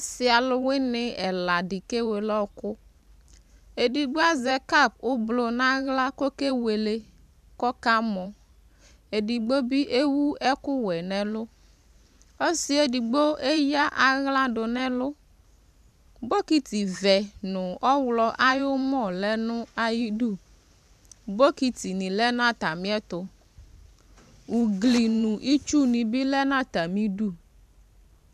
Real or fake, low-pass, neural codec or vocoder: fake; 14.4 kHz; vocoder, 44.1 kHz, 128 mel bands every 512 samples, BigVGAN v2